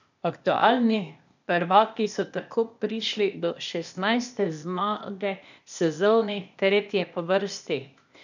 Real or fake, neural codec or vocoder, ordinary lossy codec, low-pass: fake; codec, 16 kHz, 0.8 kbps, ZipCodec; none; 7.2 kHz